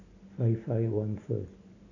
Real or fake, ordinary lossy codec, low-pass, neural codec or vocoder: real; none; 7.2 kHz; none